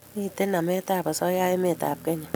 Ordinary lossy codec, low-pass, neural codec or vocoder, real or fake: none; none; none; real